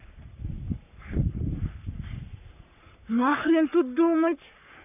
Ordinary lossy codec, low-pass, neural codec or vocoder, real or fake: none; 3.6 kHz; codec, 44.1 kHz, 3.4 kbps, Pupu-Codec; fake